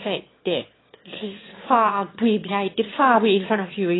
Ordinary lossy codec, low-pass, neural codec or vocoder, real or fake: AAC, 16 kbps; 7.2 kHz; autoencoder, 22.05 kHz, a latent of 192 numbers a frame, VITS, trained on one speaker; fake